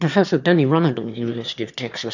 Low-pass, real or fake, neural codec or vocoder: 7.2 kHz; fake; autoencoder, 22.05 kHz, a latent of 192 numbers a frame, VITS, trained on one speaker